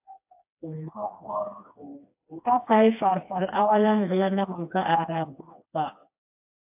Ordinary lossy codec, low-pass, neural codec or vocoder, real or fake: AAC, 32 kbps; 3.6 kHz; codec, 16 kHz, 2 kbps, FreqCodec, smaller model; fake